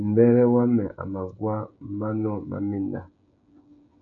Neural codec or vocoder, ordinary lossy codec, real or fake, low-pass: codec, 16 kHz, 16 kbps, FreqCodec, smaller model; MP3, 64 kbps; fake; 7.2 kHz